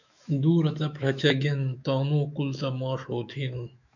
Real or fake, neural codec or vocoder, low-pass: fake; autoencoder, 48 kHz, 128 numbers a frame, DAC-VAE, trained on Japanese speech; 7.2 kHz